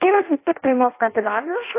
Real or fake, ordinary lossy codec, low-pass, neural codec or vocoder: fake; AAC, 24 kbps; 3.6 kHz; codec, 16 kHz in and 24 kHz out, 0.6 kbps, FireRedTTS-2 codec